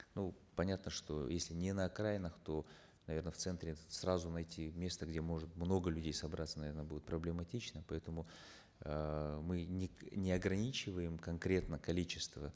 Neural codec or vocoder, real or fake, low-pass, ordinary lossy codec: none; real; none; none